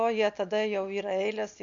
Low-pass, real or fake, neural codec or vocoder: 7.2 kHz; real; none